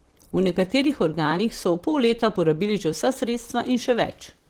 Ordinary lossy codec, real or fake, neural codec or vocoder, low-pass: Opus, 16 kbps; fake; vocoder, 44.1 kHz, 128 mel bands, Pupu-Vocoder; 19.8 kHz